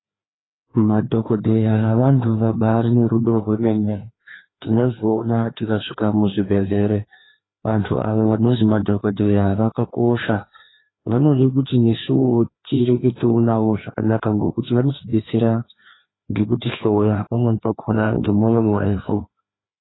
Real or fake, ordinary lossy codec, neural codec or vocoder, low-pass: fake; AAC, 16 kbps; codec, 16 kHz, 2 kbps, FreqCodec, larger model; 7.2 kHz